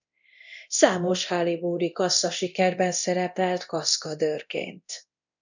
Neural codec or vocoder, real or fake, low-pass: codec, 24 kHz, 0.9 kbps, DualCodec; fake; 7.2 kHz